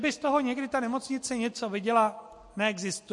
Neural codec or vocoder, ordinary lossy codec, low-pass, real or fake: none; MP3, 48 kbps; 10.8 kHz; real